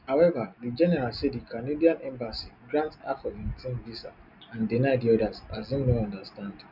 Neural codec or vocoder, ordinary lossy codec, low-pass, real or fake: none; none; 5.4 kHz; real